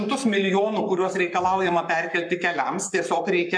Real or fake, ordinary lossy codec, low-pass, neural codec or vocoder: fake; MP3, 96 kbps; 9.9 kHz; codec, 44.1 kHz, 7.8 kbps, Pupu-Codec